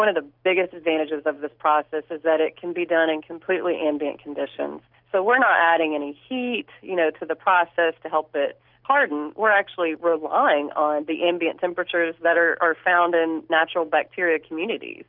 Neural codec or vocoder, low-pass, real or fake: none; 5.4 kHz; real